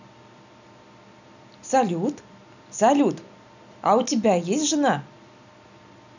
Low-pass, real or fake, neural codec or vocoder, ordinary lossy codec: 7.2 kHz; real; none; none